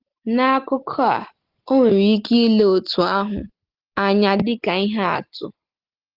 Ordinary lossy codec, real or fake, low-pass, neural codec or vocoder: Opus, 16 kbps; real; 5.4 kHz; none